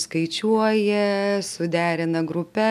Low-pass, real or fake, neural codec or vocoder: 14.4 kHz; real; none